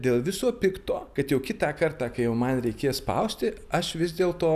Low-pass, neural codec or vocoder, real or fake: 14.4 kHz; none; real